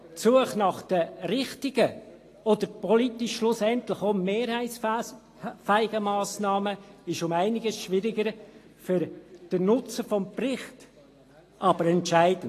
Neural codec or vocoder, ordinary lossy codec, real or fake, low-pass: none; AAC, 48 kbps; real; 14.4 kHz